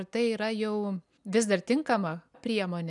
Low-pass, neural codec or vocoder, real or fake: 10.8 kHz; none; real